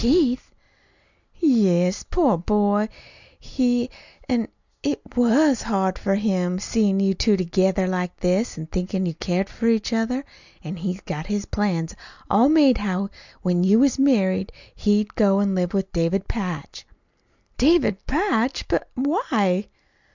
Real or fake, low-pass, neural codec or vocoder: real; 7.2 kHz; none